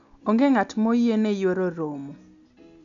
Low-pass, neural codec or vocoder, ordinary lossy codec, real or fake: 7.2 kHz; none; none; real